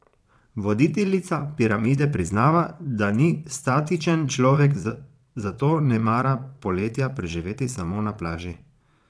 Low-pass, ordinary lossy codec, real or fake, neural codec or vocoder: none; none; fake; vocoder, 22.05 kHz, 80 mel bands, Vocos